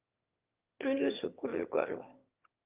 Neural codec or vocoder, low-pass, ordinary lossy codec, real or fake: autoencoder, 22.05 kHz, a latent of 192 numbers a frame, VITS, trained on one speaker; 3.6 kHz; Opus, 32 kbps; fake